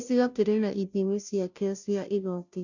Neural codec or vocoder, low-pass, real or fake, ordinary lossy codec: codec, 16 kHz, 0.5 kbps, FunCodec, trained on Chinese and English, 25 frames a second; 7.2 kHz; fake; none